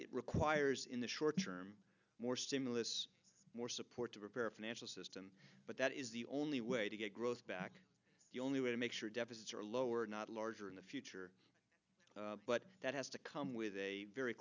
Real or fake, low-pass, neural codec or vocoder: real; 7.2 kHz; none